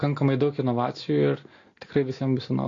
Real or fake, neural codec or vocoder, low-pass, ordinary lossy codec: real; none; 7.2 kHz; AAC, 32 kbps